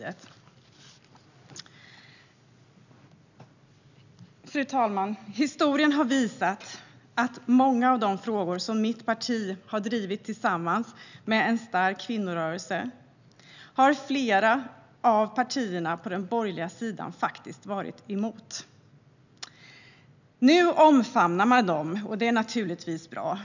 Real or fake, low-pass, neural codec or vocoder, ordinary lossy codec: real; 7.2 kHz; none; none